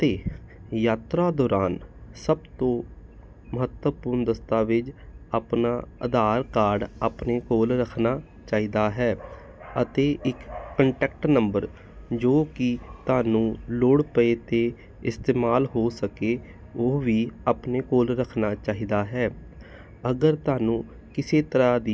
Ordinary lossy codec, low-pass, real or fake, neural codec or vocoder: none; none; real; none